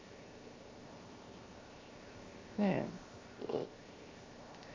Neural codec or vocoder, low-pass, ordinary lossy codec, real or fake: codec, 16 kHz, 0.7 kbps, FocalCodec; 7.2 kHz; MP3, 32 kbps; fake